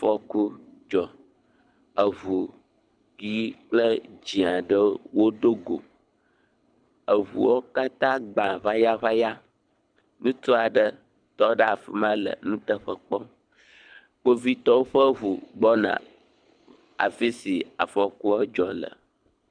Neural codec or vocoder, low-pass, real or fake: codec, 24 kHz, 6 kbps, HILCodec; 9.9 kHz; fake